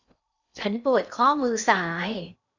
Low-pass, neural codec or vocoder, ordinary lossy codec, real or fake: 7.2 kHz; codec, 16 kHz in and 24 kHz out, 0.8 kbps, FocalCodec, streaming, 65536 codes; none; fake